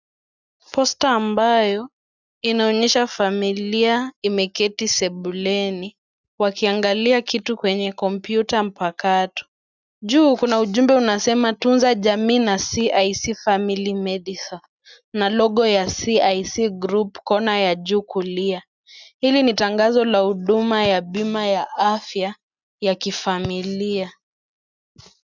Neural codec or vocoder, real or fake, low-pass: none; real; 7.2 kHz